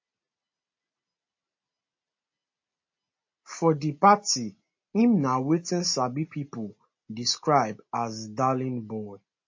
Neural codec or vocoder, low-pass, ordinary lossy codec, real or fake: none; 7.2 kHz; MP3, 32 kbps; real